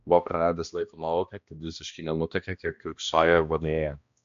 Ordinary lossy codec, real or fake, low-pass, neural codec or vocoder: MP3, 64 kbps; fake; 7.2 kHz; codec, 16 kHz, 1 kbps, X-Codec, HuBERT features, trained on balanced general audio